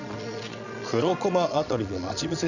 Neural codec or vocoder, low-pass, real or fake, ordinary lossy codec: vocoder, 22.05 kHz, 80 mel bands, Vocos; 7.2 kHz; fake; none